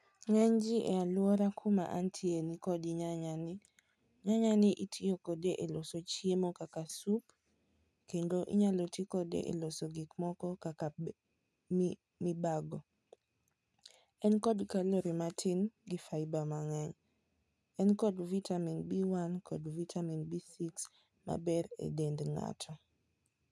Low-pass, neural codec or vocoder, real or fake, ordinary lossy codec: none; none; real; none